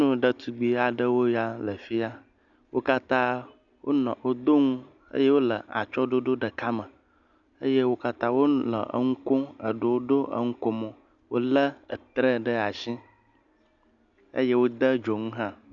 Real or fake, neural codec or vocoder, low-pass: real; none; 7.2 kHz